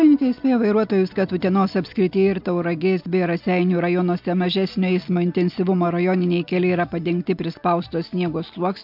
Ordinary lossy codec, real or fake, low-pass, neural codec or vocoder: MP3, 48 kbps; real; 5.4 kHz; none